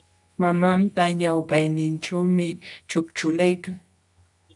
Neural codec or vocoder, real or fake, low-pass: codec, 24 kHz, 0.9 kbps, WavTokenizer, medium music audio release; fake; 10.8 kHz